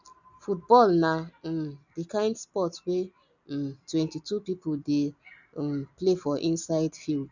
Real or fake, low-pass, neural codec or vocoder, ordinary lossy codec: real; 7.2 kHz; none; none